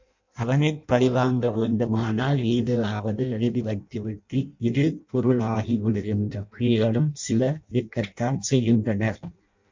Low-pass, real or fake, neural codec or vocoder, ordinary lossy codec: 7.2 kHz; fake; codec, 16 kHz in and 24 kHz out, 0.6 kbps, FireRedTTS-2 codec; MP3, 64 kbps